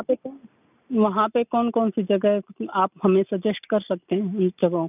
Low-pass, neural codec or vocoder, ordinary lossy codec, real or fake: 3.6 kHz; none; none; real